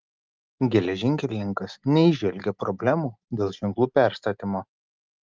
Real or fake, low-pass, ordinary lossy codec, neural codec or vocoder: real; 7.2 kHz; Opus, 32 kbps; none